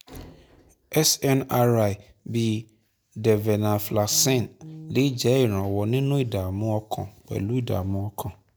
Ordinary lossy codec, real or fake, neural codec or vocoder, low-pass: none; real; none; none